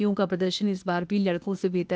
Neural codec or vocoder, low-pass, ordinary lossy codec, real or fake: codec, 16 kHz, 0.8 kbps, ZipCodec; none; none; fake